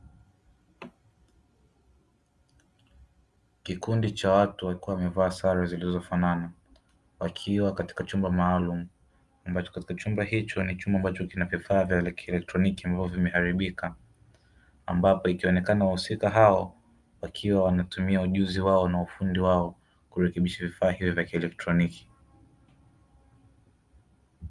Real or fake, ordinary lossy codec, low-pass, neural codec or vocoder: real; Opus, 32 kbps; 10.8 kHz; none